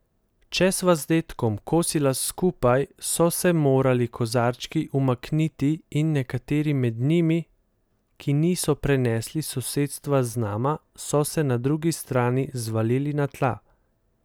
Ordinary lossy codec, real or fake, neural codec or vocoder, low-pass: none; real; none; none